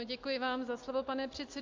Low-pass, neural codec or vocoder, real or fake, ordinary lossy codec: 7.2 kHz; none; real; MP3, 48 kbps